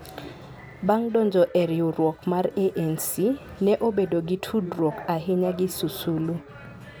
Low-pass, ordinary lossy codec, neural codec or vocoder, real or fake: none; none; none; real